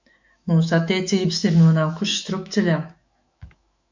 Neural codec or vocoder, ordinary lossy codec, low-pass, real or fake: autoencoder, 48 kHz, 128 numbers a frame, DAC-VAE, trained on Japanese speech; MP3, 64 kbps; 7.2 kHz; fake